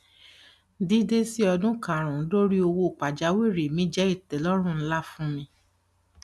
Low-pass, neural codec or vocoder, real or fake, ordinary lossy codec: none; none; real; none